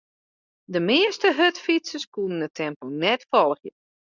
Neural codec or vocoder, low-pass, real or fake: none; 7.2 kHz; real